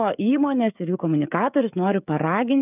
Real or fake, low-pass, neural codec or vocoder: fake; 3.6 kHz; codec, 16 kHz, 16 kbps, FreqCodec, smaller model